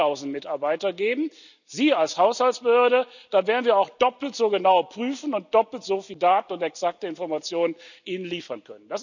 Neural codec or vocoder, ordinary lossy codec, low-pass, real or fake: none; none; 7.2 kHz; real